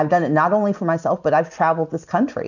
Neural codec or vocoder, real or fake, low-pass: none; real; 7.2 kHz